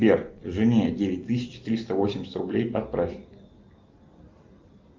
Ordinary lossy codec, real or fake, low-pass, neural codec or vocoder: Opus, 32 kbps; real; 7.2 kHz; none